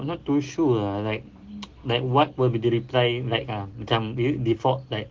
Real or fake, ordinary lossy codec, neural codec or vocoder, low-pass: real; Opus, 16 kbps; none; 7.2 kHz